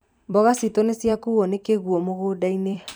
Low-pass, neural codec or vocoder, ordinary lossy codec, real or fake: none; vocoder, 44.1 kHz, 128 mel bands every 256 samples, BigVGAN v2; none; fake